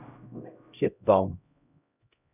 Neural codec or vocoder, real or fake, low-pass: codec, 16 kHz, 0.5 kbps, X-Codec, HuBERT features, trained on LibriSpeech; fake; 3.6 kHz